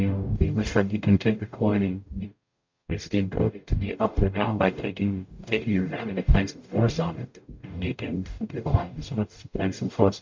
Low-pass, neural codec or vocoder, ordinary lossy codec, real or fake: 7.2 kHz; codec, 44.1 kHz, 0.9 kbps, DAC; MP3, 48 kbps; fake